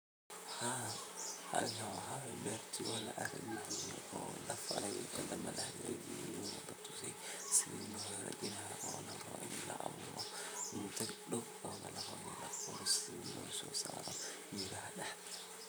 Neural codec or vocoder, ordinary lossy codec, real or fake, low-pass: vocoder, 44.1 kHz, 128 mel bands, Pupu-Vocoder; none; fake; none